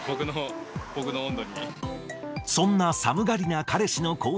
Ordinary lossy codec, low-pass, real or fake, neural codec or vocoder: none; none; real; none